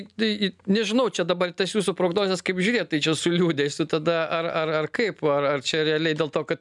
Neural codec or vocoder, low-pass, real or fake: none; 10.8 kHz; real